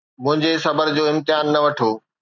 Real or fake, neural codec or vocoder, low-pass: real; none; 7.2 kHz